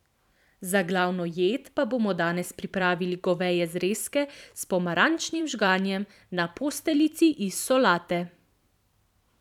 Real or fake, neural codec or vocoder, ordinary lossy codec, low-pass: fake; vocoder, 44.1 kHz, 128 mel bands every 512 samples, BigVGAN v2; none; 19.8 kHz